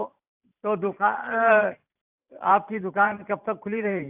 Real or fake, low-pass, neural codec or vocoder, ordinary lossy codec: fake; 3.6 kHz; vocoder, 44.1 kHz, 128 mel bands every 512 samples, BigVGAN v2; AAC, 32 kbps